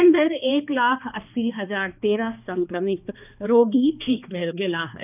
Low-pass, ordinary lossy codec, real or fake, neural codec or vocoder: 3.6 kHz; none; fake; codec, 16 kHz, 2 kbps, X-Codec, HuBERT features, trained on balanced general audio